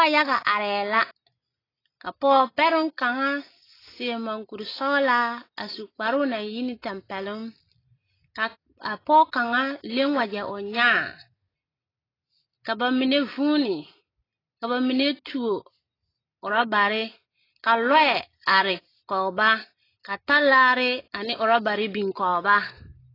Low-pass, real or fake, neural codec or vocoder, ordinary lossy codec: 5.4 kHz; real; none; AAC, 24 kbps